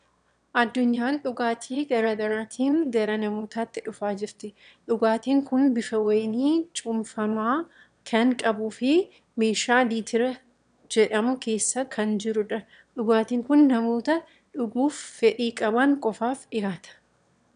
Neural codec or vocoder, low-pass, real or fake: autoencoder, 22.05 kHz, a latent of 192 numbers a frame, VITS, trained on one speaker; 9.9 kHz; fake